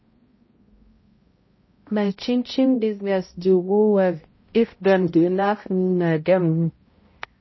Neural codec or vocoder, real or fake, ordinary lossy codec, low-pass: codec, 16 kHz, 0.5 kbps, X-Codec, HuBERT features, trained on balanced general audio; fake; MP3, 24 kbps; 7.2 kHz